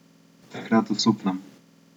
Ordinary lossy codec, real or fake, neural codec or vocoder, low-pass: none; real; none; 19.8 kHz